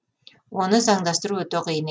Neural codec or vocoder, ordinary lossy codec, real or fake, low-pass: none; none; real; none